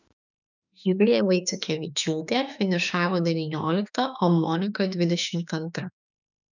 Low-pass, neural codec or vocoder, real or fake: 7.2 kHz; autoencoder, 48 kHz, 32 numbers a frame, DAC-VAE, trained on Japanese speech; fake